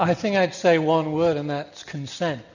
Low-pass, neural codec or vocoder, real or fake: 7.2 kHz; none; real